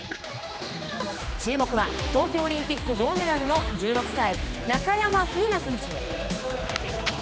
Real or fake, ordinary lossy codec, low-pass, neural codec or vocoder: fake; none; none; codec, 16 kHz, 2 kbps, X-Codec, HuBERT features, trained on general audio